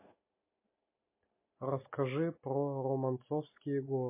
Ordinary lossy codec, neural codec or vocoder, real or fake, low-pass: MP3, 24 kbps; none; real; 3.6 kHz